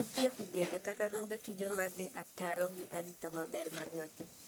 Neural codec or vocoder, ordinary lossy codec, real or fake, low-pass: codec, 44.1 kHz, 1.7 kbps, Pupu-Codec; none; fake; none